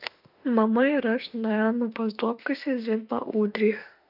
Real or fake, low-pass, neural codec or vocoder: fake; 5.4 kHz; autoencoder, 48 kHz, 32 numbers a frame, DAC-VAE, trained on Japanese speech